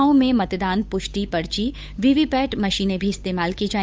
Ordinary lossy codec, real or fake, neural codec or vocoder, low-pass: none; fake; codec, 16 kHz, 8 kbps, FunCodec, trained on Chinese and English, 25 frames a second; none